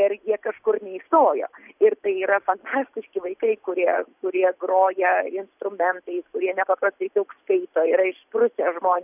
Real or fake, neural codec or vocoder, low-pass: real; none; 3.6 kHz